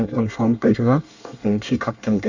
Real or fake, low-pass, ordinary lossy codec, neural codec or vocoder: fake; 7.2 kHz; Opus, 64 kbps; codec, 24 kHz, 1 kbps, SNAC